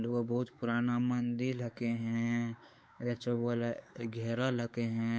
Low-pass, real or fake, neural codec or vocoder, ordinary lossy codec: none; fake; codec, 16 kHz, 4 kbps, X-Codec, WavLM features, trained on Multilingual LibriSpeech; none